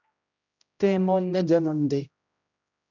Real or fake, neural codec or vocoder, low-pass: fake; codec, 16 kHz, 0.5 kbps, X-Codec, HuBERT features, trained on general audio; 7.2 kHz